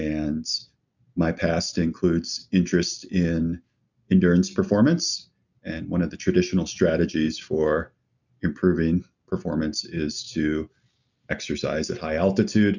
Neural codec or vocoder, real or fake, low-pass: none; real; 7.2 kHz